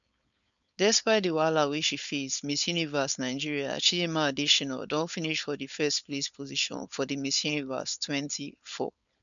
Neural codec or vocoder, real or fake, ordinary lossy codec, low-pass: codec, 16 kHz, 4.8 kbps, FACodec; fake; none; 7.2 kHz